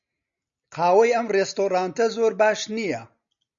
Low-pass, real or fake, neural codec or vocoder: 7.2 kHz; real; none